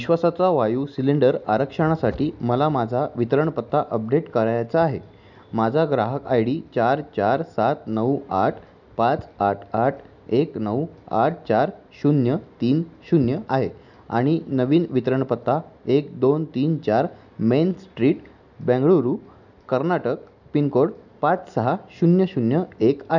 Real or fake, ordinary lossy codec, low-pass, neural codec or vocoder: real; none; 7.2 kHz; none